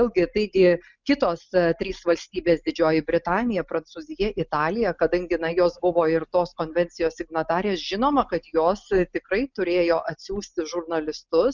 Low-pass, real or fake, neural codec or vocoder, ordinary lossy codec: 7.2 kHz; real; none; Opus, 64 kbps